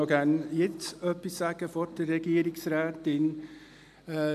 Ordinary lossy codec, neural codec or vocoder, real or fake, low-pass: none; none; real; 14.4 kHz